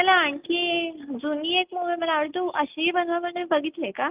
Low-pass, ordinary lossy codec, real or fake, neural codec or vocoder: 3.6 kHz; Opus, 24 kbps; real; none